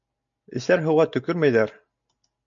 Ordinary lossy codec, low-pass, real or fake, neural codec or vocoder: MP3, 64 kbps; 7.2 kHz; real; none